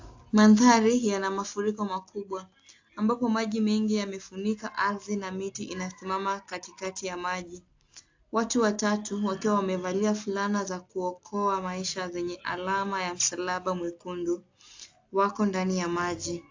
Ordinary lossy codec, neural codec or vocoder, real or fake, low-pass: AAC, 48 kbps; none; real; 7.2 kHz